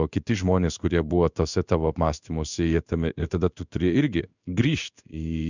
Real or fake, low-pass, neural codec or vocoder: fake; 7.2 kHz; codec, 16 kHz in and 24 kHz out, 1 kbps, XY-Tokenizer